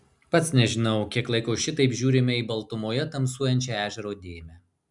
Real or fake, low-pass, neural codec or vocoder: real; 10.8 kHz; none